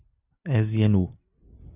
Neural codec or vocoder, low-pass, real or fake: none; 3.6 kHz; real